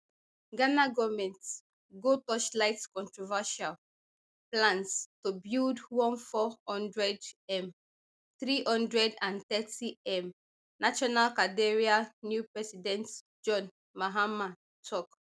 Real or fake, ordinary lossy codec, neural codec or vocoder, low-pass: real; none; none; none